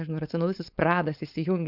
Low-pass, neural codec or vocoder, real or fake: 5.4 kHz; codec, 16 kHz, 4.8 kbps, FACodec; fake